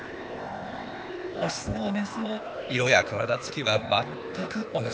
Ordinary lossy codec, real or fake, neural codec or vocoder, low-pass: none; fake; codec, 16 kHz, 0.8 kbps, ZipCodec; none